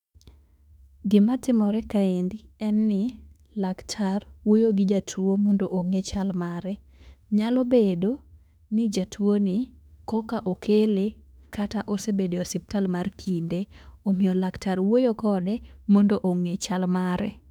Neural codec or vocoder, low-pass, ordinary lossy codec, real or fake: autoencoder, 48 kHz, 32 numbers a frame, DAC-VAE, trained on Japanese speech; 19.8 kHz; none; fake